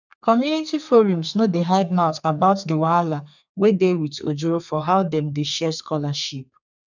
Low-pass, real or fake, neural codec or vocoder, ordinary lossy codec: 7.2 kHz; fake; codec, 32 kHz, 1.9 kbps, SNAC; none